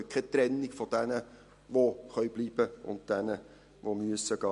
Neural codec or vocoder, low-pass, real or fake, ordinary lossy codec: none; 14.4 kHz; real; MP3, 48 kbps